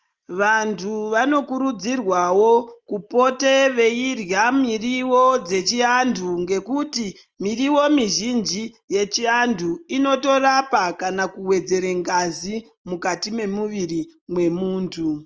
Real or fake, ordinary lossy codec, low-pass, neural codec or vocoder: real; Opus, 24 kbps; 7.2 kHz; none